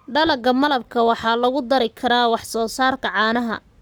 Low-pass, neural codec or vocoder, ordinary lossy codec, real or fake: none; codec, 44.1 kHz, 7.8 kbps, Pupu-Codec; none; fake